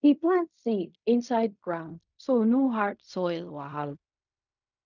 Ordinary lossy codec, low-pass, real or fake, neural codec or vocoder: none; 7.2 kHz; fake; codec, 16 kHz in and 24 kHz out, 0.4 kbps, LongCat-Audio-Codec, fine tuned four codebook decoder